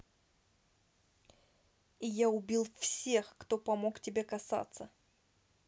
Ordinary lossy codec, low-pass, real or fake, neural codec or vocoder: none; none; real; none